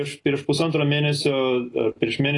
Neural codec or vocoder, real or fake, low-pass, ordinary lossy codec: none; real; 10.8 kHz; AAC, 32 kbps